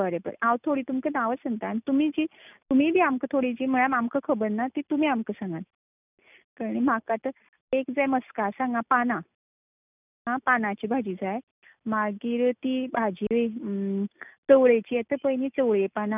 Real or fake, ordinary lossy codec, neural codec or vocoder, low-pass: real; none; none; 3.6 kHz